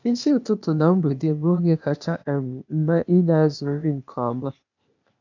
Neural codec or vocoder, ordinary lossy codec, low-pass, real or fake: codec, 16 kHz, 0.8 kbps, ZipCodec; none; 7.2 kHz; fake